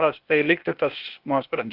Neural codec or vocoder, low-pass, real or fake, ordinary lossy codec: codec, 16 kHz, 0.8 kbps, ZipCodec; 5.4 kHz; fake; Opus, 32 kbps